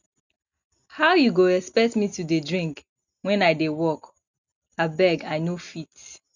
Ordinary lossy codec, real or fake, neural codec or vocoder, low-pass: none; real; none; 7.2 kHz